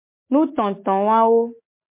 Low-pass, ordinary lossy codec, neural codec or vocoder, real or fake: 3.6 kHz; MP3, 24 kbps; none; real